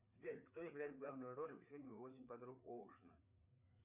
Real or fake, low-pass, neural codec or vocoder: fake; 3.6 kHz; codec, 16 kHz, 4 kbps, FreqCodec, larger model